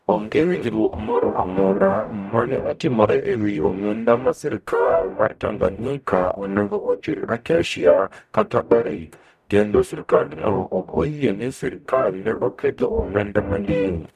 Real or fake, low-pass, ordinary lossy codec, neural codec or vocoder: fake; 14.4 kHz; none; codec, 44.1 kHz, 0.9 kbps, DAC